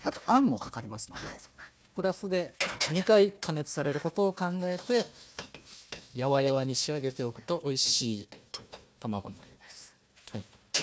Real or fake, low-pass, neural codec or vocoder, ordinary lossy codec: fake; none; codec, 16 kHz, 1 kbps, FunCodec, trained on Chinese and English, 50 frames a second; none